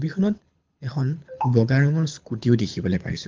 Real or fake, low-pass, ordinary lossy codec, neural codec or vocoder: fake; 7.2 kHz; Opus, 32 kbps; codec, 24 kHz, 6 kbps, HILCodec